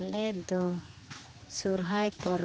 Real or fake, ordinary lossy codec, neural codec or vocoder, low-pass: fake; none; codec, 16 kHz, 2 kbps, X-Codec, HuBERT features, trained on general audio; none